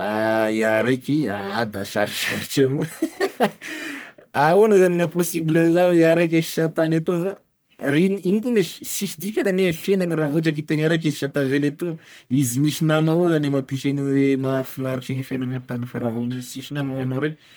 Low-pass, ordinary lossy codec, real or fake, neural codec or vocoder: none; none; fake; codec, 44.1 kHz, 1.7 kbps, Pupu-Codec